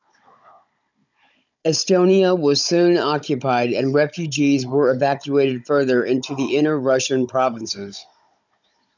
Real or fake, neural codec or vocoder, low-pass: fake; codec, 16 kHz, 16 kbps, FunCodec, trained on Chinese and English, 50 frames a second; 7.2 kHz